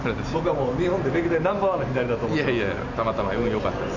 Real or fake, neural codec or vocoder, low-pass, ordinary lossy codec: real; none; 7.2 kHz; none